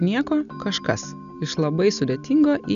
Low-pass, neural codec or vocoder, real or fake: 7.2 kHz; none; real